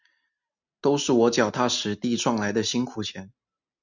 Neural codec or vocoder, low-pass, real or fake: none; 7.2 kHz; real